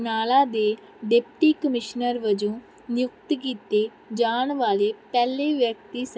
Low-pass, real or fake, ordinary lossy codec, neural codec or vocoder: none; real; none; none